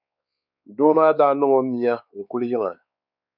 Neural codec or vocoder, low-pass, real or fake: codec, 16 kHz, 4 kbps, X-Codec, WavLM features, trained on Multilingual LibriSpeech; 5.4 kHz; fake